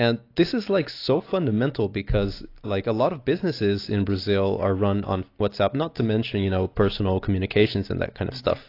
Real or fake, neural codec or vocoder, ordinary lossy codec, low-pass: real; none; AAC, 32 kbps; 5.4 kHz